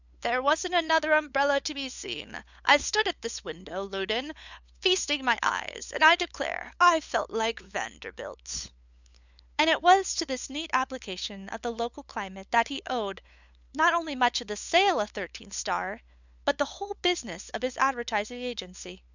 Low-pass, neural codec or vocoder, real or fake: 7.2 kHz; codec, 16 kHz, 8 kbps, FunCodec, trained on LibriTTS, 25 frames a second; fake